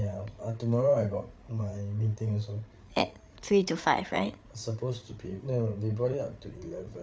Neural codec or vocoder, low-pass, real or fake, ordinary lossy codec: codec, 16 kHz, 8 kbps, FreqCodec, larger model; none; fake; none